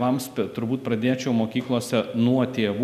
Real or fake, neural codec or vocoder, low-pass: real; none; 14.4 kHz